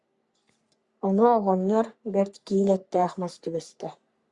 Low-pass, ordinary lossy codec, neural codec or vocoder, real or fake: 10.8 kHz; Opus, 64 kbps; codec, 44.1 kHz, 3.4 kbps, Pupu-Codec; fake